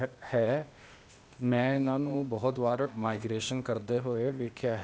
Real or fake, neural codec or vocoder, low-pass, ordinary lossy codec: fake; codec, 16 kHz, 0.8 kbps, ZipCodec; none; none